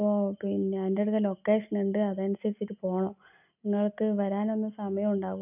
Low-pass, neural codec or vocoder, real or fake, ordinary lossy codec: 3.6 kHz; none; real; none